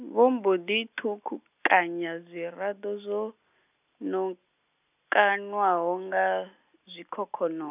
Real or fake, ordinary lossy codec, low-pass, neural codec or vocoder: real; none; 3.6 kHz; none